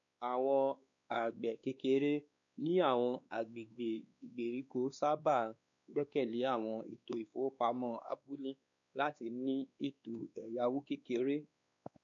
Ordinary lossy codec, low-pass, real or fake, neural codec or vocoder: none; 7.2 kHz; fake; codec, 16 kHz, 2 kbps, X-Codec, WavLM features, trained on Multilingual LibriSpeech